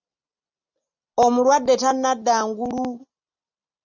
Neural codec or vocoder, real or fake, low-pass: none; real; 7.2 kHz